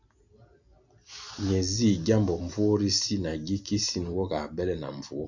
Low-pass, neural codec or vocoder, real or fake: 7.2 kHz; none; real